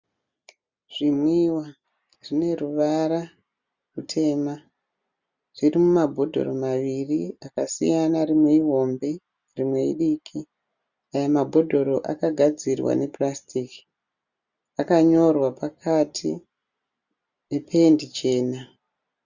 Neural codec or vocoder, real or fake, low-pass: none; real; 7.2 kHz